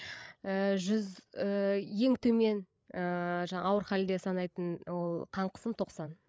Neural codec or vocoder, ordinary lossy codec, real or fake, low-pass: codec, 16 kHz, 8 kbps, FreqCodec, larger model; none; fake; none